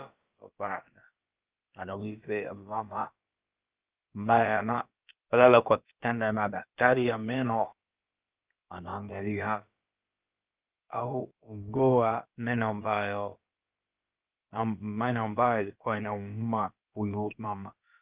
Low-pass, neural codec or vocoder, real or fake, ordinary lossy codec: 3.6 kHz; codec, 16 kHz, about 1 kbps, DyCAST, with the encoder's durations; fake; Opus, 24 kbps